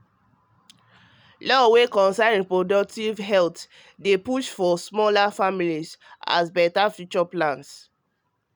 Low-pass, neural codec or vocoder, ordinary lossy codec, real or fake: none; none; none; real